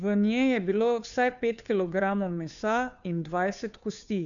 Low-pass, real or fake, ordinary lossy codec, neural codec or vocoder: 7.2 kHz; fake; none; codec, 16 kHz, 2 kbps, FunCodec, trained on Chinese and English, 25 frames a second